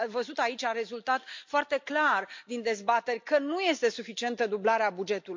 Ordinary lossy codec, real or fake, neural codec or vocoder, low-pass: MP3, 48 kbps; real; none; 7.2 kHz